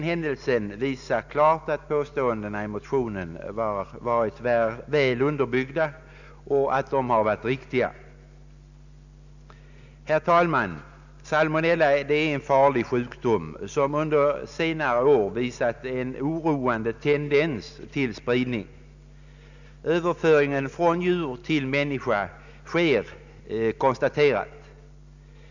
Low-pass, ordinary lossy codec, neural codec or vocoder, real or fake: 7.2 kHz; none; none; real